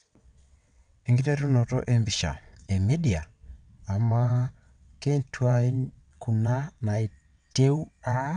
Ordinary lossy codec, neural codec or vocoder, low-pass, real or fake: none; vocoder, 22.05 kHz, 80 mel bands, WaveNeXt; 9.9 kHz; fake